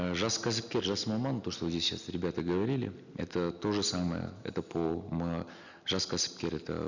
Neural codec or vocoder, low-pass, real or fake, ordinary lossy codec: none; 7.2 kHz; real; none